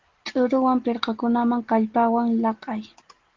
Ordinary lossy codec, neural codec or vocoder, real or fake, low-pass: Opus, 16 kbps; none; real; 7.2 kHz